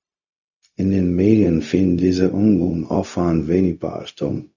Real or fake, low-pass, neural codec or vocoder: fake; 7.2 kHz; codec, 16 kHz, 0.4 kbps, LongCat-Audio-Codec